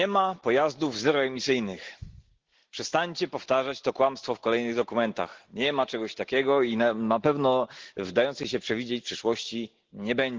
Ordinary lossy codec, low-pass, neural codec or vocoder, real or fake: Opus, 16 kbps; 7.2 kHz; none; real